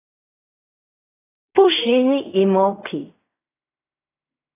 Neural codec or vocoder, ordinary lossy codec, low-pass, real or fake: codec, 16 kHz in and 24 kHz out, 0.4 kbps, LongCat-Audio-Codec, fine tuned four codebook decoder; AAC, 24 kbps; 3.6 kHz; fake